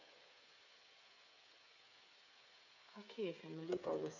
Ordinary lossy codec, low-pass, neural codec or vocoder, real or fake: none; 7.2 kHz; codec, 16 kHz, 8 kbps, FreqCodec, smaller model; fake